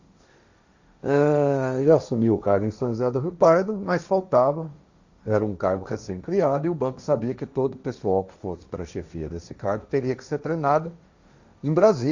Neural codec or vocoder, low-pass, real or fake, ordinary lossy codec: codec, 16 kHz, 1.1 kbps, Voila-Tokenizer; 7.2 kHz; fake; Opus, 64 kbps